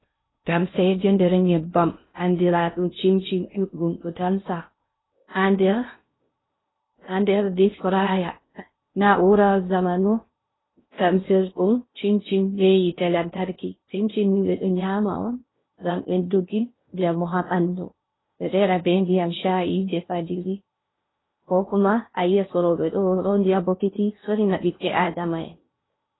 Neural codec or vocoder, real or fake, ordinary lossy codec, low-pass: codec, 16 kHz in and 24 kHz out, 0.6 kbps, FocalCodec, streaming, 2048 codes; fake; AAC, 16 kbps; 7.2 kHz